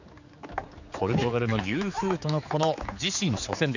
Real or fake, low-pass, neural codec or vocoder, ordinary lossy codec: fake; 7.2 kHz; codec, 16 kHz, 4 kbps, X-Codec, HuBERT features, trained on general audio; none